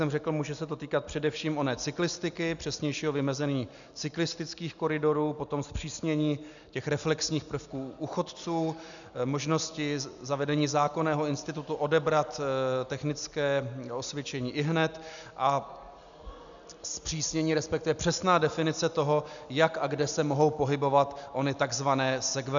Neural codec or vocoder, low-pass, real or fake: none; 7.2 kHz; real